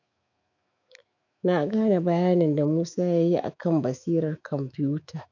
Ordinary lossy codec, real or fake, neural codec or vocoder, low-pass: none; fake; autoencoder, 48 kHz, 128 numbers a frame, DAC-VAE, trained on Japanese speech; 7.2 kHz